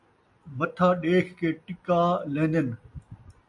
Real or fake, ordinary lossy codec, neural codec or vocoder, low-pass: real; AAC, 64 kbps; none; 10.8 kHz